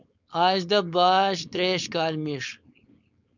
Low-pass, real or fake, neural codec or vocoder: 7.2 kHz; fake; codec, 16 kHz, 4.8 kbps, FACodec